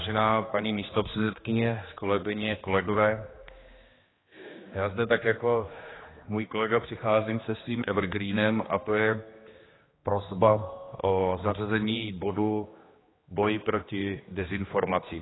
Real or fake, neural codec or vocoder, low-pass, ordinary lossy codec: fake; codec, 16 kHz, 2 kbps, X-Codec, HuBERT features, trained on general audio; 7.2 kHz; AAC, 16 kbps